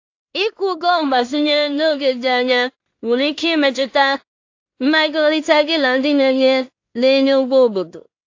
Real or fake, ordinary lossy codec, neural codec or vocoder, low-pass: fake; AAC, 48 kbps; codec, 16 kHz in and 24 kHz out, 0.4 kbps, LongCat-Audio-Codec, two codebook decoder; 7.2 kHz